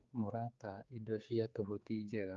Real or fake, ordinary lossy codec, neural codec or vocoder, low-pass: fake; Opus, 32 kbps; codec, 16 kHz, 4 kbps, X-Codec, HuBERT features, trained on general audio; 7.2 kHz